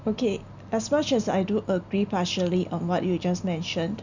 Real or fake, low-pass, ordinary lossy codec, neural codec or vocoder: real; 7.2 kHz; none; none